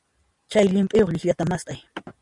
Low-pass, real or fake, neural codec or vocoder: 10.8 kHz; real; none